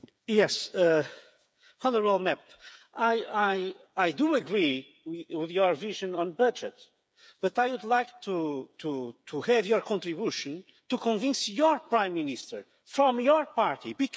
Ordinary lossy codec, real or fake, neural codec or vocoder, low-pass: none; fake; codec, 16 kHz, 8 kbps, FreqCodec, smaller model; none